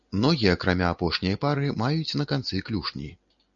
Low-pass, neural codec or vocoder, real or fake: 7.2 kHz; none; real